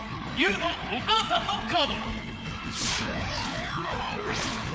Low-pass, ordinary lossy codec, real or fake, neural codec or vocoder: none; none; fake; codec, 16 kHz, 4 kbps, FreqCodec, larger model